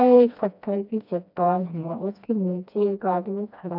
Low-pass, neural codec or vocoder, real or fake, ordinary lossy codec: 5.4 kHz; codec, 16 kHz, 1 kbps, FreqCodec, smaller model; fake; none